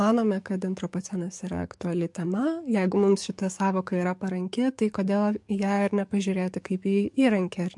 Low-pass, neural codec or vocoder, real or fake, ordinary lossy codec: 10.8 kHz; codec, 44.1 kHz, 7.8 kbps, DAC; fake; MP3, 64 kbps